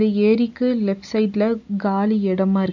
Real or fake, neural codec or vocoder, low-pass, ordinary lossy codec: real; none; 7.2 kHz; none